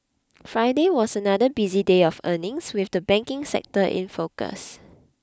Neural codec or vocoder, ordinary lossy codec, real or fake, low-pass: none; none; real; none